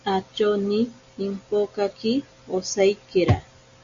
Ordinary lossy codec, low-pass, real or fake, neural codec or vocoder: Opus, 64 kbps; 7.2 kHz; real; none